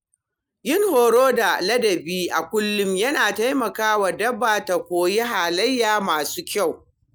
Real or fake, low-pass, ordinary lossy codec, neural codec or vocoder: real; none; none; none